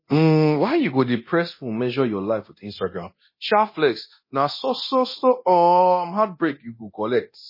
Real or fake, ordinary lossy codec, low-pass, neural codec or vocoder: fake; MP3, 24 kbps; 5.4 kHz; codec, 24 kHz, 0.9 kbps, DualCodec